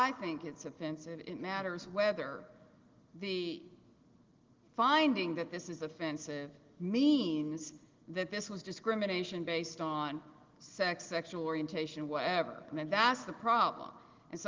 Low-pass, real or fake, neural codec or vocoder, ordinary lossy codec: 7.2 kHz; real; none; Opus, 16 kbps